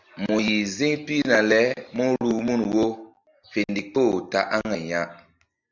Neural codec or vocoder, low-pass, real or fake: none; 7.2 kHz; real